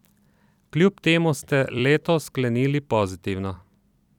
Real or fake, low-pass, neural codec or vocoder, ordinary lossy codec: fake; 19.8 kHz; vocoder, 44.1 kHz, 128 mel bands every 256 samples, BigVGAN v2; none